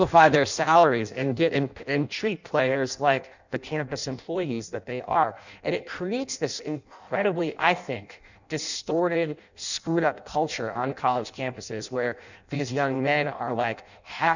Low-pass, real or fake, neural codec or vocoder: 7.2 kHz; fake; codec, 16 kHz in and 24 kHz out, 0.6 kbps, FireRedTTS-2 codec